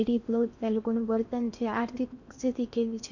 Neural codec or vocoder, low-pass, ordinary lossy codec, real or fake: codec, 16 kHz in and 24 kHz out, 0.8 kbps, FocalCodec, streaming, 65536 codes; 7.2 kHz; none; fake